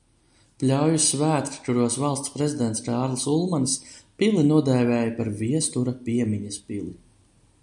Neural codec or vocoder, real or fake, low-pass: none; real; 10.8 kHz